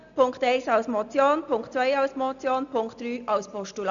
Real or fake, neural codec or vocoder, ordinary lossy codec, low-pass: real; none; none; 7.2 kHz